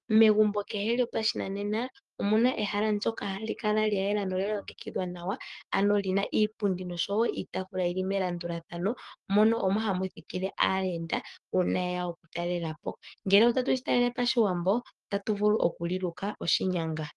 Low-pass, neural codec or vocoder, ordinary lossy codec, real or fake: 10.8 kHz; codec, 44.1 kHz, 7.8 kbps, DAC; Opus, 24 kbps; fake